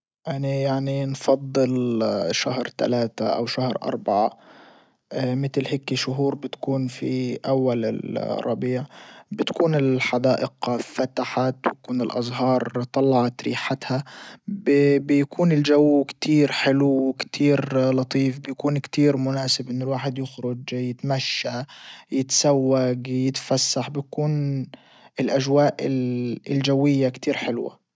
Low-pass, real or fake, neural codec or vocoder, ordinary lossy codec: none; real; none; none